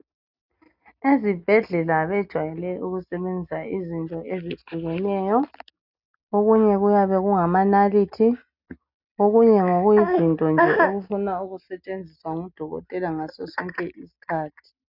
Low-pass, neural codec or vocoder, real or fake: 5.4 kHz; none; real